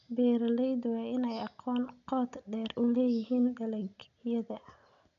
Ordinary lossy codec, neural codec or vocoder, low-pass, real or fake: none; none; 7.2 kHz; real